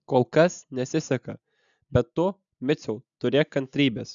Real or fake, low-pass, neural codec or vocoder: real; 7.2 kHz; none